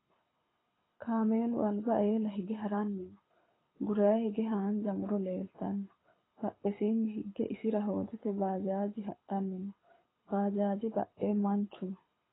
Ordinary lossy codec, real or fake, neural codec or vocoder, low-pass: AAC, 16 kbps; fake; codec, 24 kHz, 6 kbps, HILCodec; 7.2 kHz